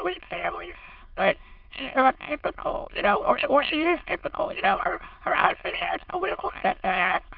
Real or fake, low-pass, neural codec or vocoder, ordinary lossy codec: fake; 5.4 kHz; autoencoder, 22.05 kHz, a latent of 192 numbers a frame, VITS, trained on many speakers; MP3, 48 kbps